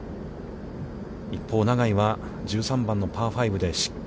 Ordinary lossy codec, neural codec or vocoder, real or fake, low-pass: none; none; real; none